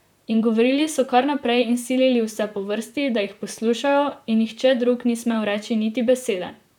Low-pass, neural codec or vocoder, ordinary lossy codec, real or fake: 19.8 kHz; vocoder, 44.1 kHz, 128 mel bands, Pupu-Vocoder; none; fake